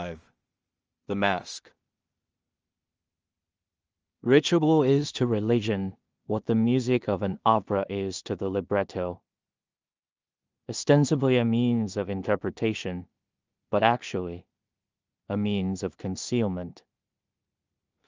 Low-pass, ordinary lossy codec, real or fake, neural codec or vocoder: 7.2 kHz; Opus, 24 kbps; fake; codec, 16 kHz in and 24 kHz out, 0.4 kbps, LongCat-Audio-Codec, two codebook decoder